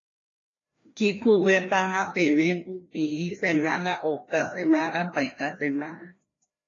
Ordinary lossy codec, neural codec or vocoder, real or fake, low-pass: AAC, 32 kbps; codec, 16 kHz, 1 kbps, FreqCodec, larger model; fake; 7.2 kHz